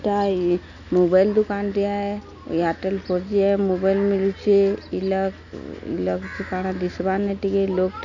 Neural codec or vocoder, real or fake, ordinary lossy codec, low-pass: none; real; none; 7.2 kHz